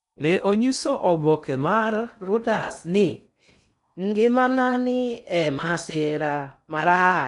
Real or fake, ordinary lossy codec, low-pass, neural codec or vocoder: fake; none; 10.8 kHz; codec, 16 kHz in and 24 kHz out, 0.6 kbps, FocalCodec, streaming, 2048 codes